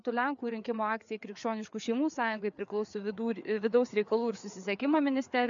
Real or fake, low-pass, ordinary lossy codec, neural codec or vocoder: fake; 7.2 kHz; MP3, 64 kbps; codec, 16 kHz, 4 kbps, FreqCodec, larger model